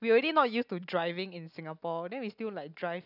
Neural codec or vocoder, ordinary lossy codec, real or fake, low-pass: none; none; real; 5.4 kHz